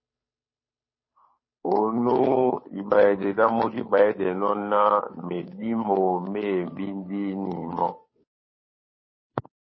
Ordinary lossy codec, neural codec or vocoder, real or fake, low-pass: MP3, 24 kbps; codec, 16 kHz, 2 kbps, FunCodec, trained on Chinese and English, 25 frames a second; fake; 7.2 kHz